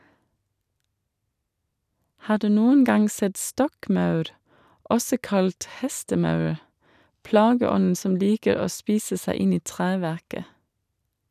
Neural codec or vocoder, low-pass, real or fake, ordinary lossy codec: none; 14.4 kHz; real; none